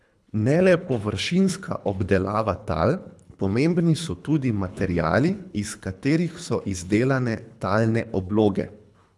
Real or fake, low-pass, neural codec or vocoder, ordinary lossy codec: fake; none; codec, 24 kHz, 3 kbps, HILCodec; none